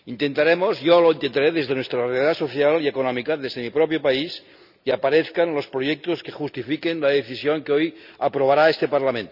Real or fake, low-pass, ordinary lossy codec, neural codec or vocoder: real; 5.4 kHz; none; none